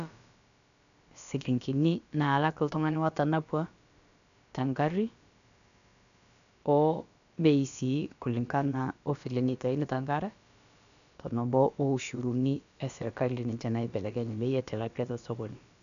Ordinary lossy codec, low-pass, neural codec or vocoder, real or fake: none; 7.2 kHz; codec, 16 kHz, about 1 kbps, DyCAST, with the encoder's durations; fake